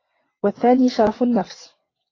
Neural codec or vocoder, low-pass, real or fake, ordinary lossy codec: vocoder, 22.05 kHz, 80 mel bands, WaveNeXt; 7.2 kHz; fake; AAC, 32 kbps